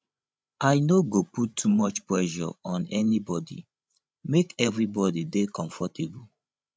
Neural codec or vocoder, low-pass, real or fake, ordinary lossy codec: codec, 16 kHz, 16 kbps, FreqCodec, larger model; none; fake; none